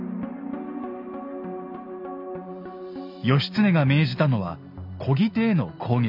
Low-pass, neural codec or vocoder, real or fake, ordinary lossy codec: 5.4 kHz; none; real; none